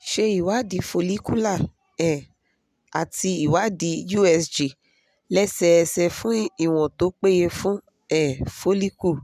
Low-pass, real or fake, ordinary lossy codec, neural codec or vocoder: 14.4 kHz; real; none; none